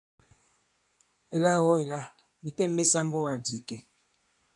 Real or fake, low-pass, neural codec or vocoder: fake; 10.8 kHz; codec, 24 kHz, 1 kbps, SNAC